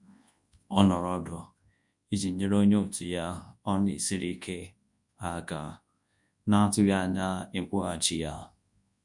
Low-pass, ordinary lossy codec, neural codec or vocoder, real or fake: 10.8 kHz; MP3, 64 kbps; codec, 24 kHz, 0.9 kbps, WavTokenizer, large speech release; fake